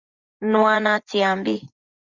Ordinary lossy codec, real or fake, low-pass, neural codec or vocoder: Opus, 64 kbps; fake; 7.2 kHz; vocoder, 24 kHz, 100 mel bands, Vocos